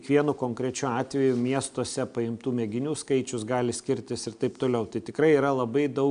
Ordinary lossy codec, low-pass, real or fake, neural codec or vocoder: AAC, 96 kbps; 9.9 kHz; real; none